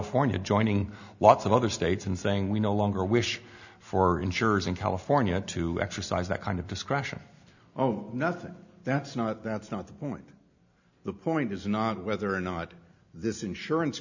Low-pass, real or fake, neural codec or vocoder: 7.2 kHz; real; none